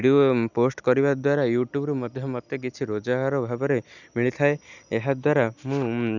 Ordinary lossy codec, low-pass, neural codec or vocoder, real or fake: none; 7.2 kHz; none; real